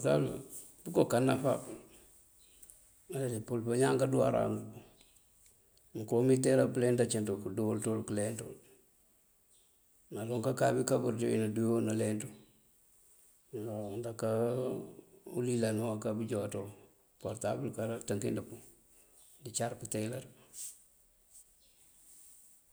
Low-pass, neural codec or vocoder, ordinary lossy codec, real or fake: none; none; none; real